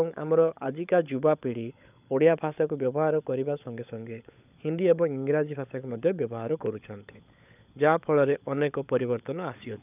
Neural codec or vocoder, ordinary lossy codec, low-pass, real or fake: codec, 16 kHz, 16 kbps, FunCodec, trained on LibriTTS, 50 frames a second; none; 3.6 kHz; fake